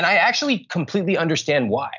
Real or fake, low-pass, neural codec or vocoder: real; 7.2 kHz; none